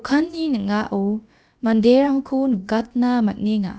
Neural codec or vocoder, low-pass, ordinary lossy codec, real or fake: codec, 16 kHz, about 1 kbps, DyCAST, with the encoder's durations; none; none; fake